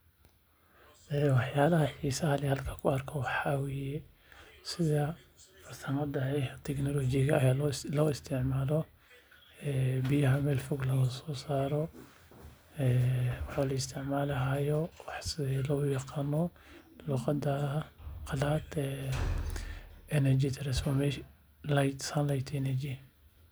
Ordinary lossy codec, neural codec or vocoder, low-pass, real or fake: none; none; none; real